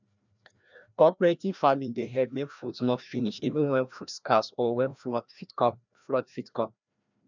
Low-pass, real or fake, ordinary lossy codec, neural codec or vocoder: 7.2 kHz; fake; none; codec, 16 kHz, 1 kbps, FreqCodec, larger model